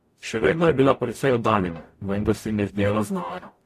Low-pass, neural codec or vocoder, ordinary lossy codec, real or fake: 14.4 kHz; codec, 44.1 kHz, 0.9 kbps, DAC; AAC, 64 kbps; fake